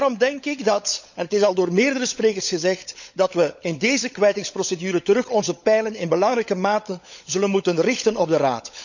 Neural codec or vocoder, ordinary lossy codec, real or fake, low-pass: codec, 16 kHz, 16 kbps, FunCodec, trained on LibriTTS, 50 frames a second; none; fake; 7.2 kHz